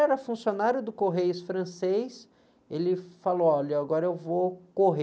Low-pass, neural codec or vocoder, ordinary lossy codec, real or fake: none; none; none; real